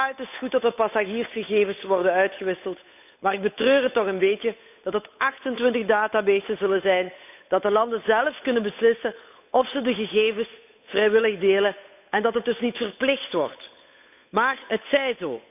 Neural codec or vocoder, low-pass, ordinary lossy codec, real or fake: codec, 16 kHz, 8 kbps, FunCodec, trained on Chinese and English, 25 frames a second; 3.6 kHz; none; fake